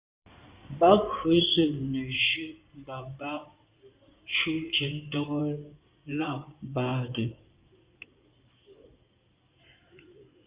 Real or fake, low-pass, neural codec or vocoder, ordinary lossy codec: fake; 3.6 kHz; codec, 16 kHz in and 24 kHz out, 2.2 kbps, FireRedTTS-2 codec; Opus, 64 kbps